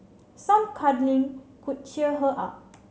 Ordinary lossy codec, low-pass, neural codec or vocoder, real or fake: none; none; none; real